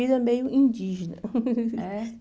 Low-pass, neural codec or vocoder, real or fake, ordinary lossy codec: none; none; real; none